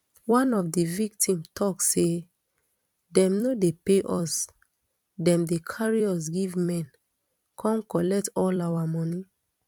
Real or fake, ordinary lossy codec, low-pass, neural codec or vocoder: fake; none; 19.8 kHz; vocoder, 44.1 kHz, 128 mel bands every 256 samples, BigVGAN v2